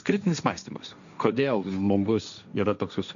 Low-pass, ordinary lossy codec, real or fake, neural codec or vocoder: 7.2 kHz; MP3, 96 kbps; fake; codec, 16 kHz, 1.1 kbps, Voila-Tokenizer